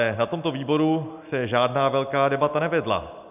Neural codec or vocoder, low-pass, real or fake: none; 3.6 kHz; real